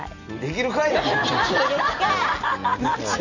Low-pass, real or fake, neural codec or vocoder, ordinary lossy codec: 7.2 kHz; real; none; none